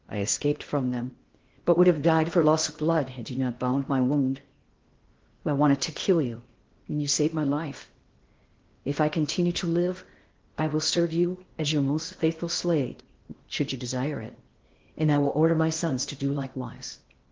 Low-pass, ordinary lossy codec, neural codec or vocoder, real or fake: 7.2 kHz; Opus, 16 kbps; codec, 16 kHz in and 24 kHz out, 0.8 kbps, FocalCodec, streaming, 65536 codes; fake